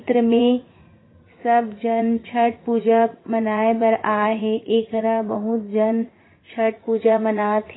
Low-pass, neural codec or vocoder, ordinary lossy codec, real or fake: 7.2 kHz; vocoder, 44.1 kHz, 80 mel bands, Vocos; AAC, 16 kbps; fake